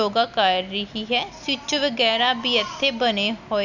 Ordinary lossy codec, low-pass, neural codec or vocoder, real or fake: none; 7.2 kHz; none; real